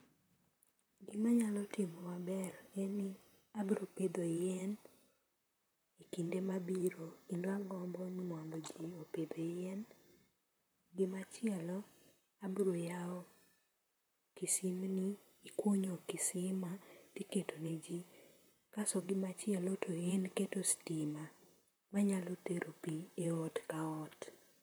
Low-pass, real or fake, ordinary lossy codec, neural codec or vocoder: none; fake; none; vocoder, 44.1 kHz, 128 mel bands, Pupu-Vocoder